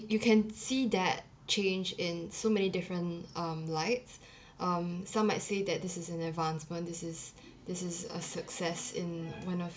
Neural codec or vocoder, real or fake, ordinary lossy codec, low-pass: none; real; none; none